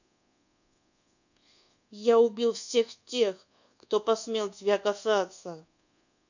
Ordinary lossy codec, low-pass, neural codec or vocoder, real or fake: none; 7.2 kHz; codec, 24 kHz, 1.2 kbps, DualCodec; fake